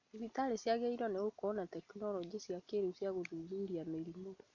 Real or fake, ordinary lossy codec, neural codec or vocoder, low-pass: real; none; none; 7.2 kHz